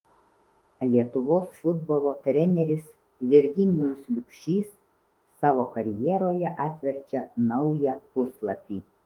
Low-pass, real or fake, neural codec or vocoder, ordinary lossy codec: 19.8 kHz; fake; autoencoder, 48 kHz, 32 numbers a frame, DAC-VAE, trained on Japanese speech; Opus, 24 kbps